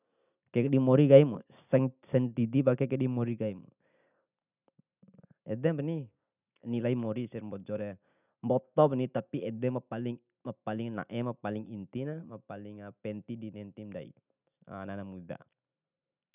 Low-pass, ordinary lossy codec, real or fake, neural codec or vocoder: 3.6 kHz; none; real; none